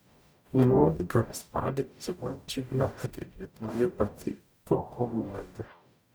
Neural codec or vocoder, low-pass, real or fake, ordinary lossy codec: codec, 44.1 kHz, 0.9 kbps, DAC; none; fake; none